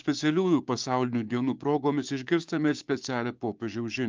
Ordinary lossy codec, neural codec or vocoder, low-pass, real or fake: Opus, 24 kbps; codec, 16 kHz, 6 kbps, DAC; 7.2 kHz; fake